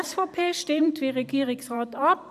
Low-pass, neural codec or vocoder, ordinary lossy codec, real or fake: 14.4 kHz; vocoder, 44.1 kHz, 128 mel bands every 512 samples, BigVGAN v2; none; fake